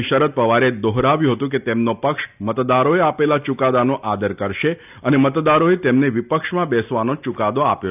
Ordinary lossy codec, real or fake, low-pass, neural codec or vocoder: none; real; 3.6 kHz; none